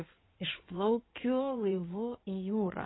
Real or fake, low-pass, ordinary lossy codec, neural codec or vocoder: fake; 7.2 kHz; AAC, 16 kbps; codec, 16 kHz in and 24 kHz out, 2.2 kbps, FireRedTTS-2 codec